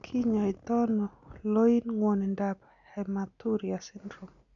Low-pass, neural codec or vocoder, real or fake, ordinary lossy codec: 7.2 kHz; none; real; none